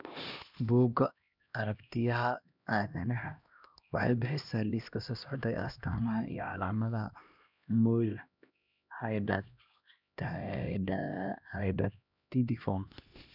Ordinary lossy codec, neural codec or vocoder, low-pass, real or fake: none; codec, 16 kHz, 1 kbps, X-Codec, HuBERT features, trained on LibriSpeech; 5.4 kHz; fake